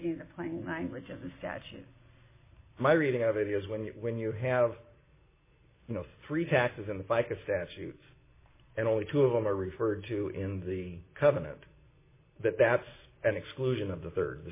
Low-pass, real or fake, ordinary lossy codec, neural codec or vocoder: 3.6 kHz; real; MP3, 16 kbps; none